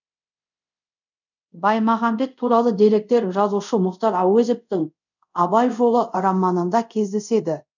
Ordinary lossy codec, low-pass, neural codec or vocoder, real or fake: none; 7.2 kHz; codec, 24 kHz, 0.5 kbps, DualCodec; fake